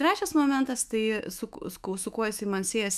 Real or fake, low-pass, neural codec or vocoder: fake; 14.4 kHz; autoencoder, 48 kHz, 128 numbers a frame, DAC-VAE, trained on Japanese speech